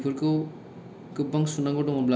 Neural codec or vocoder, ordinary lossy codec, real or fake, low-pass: none; none; real; none